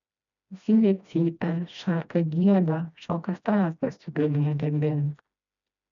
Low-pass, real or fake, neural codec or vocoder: 7.2 kHz; fake; codec, 16 kHz, 1 kbps, FreqCodec, smaller model